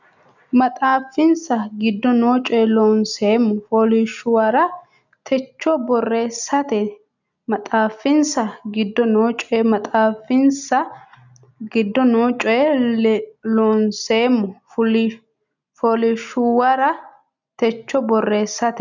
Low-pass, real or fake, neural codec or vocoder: 7.2 kHz; real; none